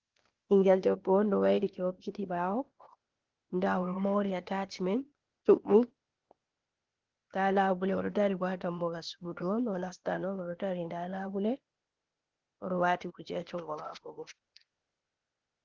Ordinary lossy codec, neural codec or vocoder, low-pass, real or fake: Opus, 16 kbps; codec, 16 kHz, 0.8 kbps, ZipCodec; 7.2 kHz; fake